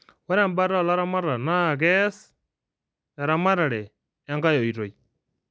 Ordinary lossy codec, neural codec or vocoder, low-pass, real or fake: none; none; none; real